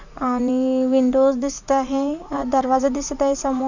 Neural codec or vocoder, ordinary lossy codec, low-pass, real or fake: vocoder, 44.1 kHz, 80 mel bands, Vocos; none; 7.2 kHz; fake